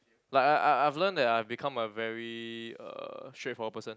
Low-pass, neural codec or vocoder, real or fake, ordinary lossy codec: none; none; real; none